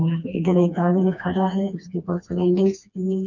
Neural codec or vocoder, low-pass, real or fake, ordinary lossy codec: codec, 16 kHz, 2 kbps, FreqCodec, smaller model; 7.2 kHz; fake; none